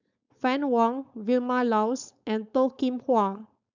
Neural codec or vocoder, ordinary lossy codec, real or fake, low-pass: codec, 16 kHz, 4.8 kbps, FACodec; none; fake; 7.2 kHz